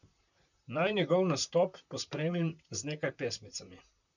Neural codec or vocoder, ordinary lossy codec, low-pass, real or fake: vocoder, 44.1 kHz, 128 mel bands, Pupu-Vocoder; none; 7.2 kHz; fake